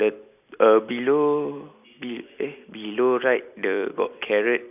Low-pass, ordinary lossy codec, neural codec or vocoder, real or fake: 3.6 kHz; none; none; real